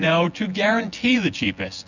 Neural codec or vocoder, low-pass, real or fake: vocoder, 24 kHz, 100 mel bands, Vocos; 7.2 kHz; fake